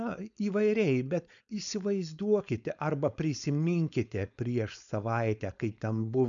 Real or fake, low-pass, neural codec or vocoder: fake; 7.2 kHz; codec, 16 kHz, 4.8 kbps, FACodec